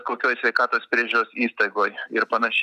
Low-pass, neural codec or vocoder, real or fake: 14.4 kHz; none; real